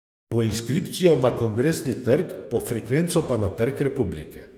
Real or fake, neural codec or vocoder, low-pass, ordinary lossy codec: fake; codec, 44.1 kHz, 2.6 kbps, DAC; 19.8 kHz; none